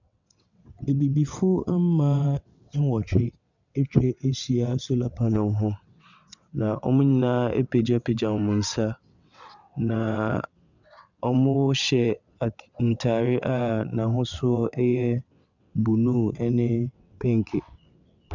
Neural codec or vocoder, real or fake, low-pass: vocoder, 22.05 kHz, 80 mel bands, WaveNeXt; fake; 7.2 kHz